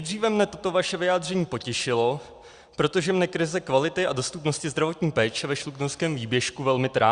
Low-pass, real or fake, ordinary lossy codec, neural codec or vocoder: 9.9 kHz; real; Opus, 64 kbps; none